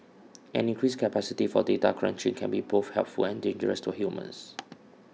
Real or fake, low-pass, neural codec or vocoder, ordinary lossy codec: real; none; none; none